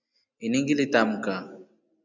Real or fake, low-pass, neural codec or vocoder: real; 7.2 kHz; none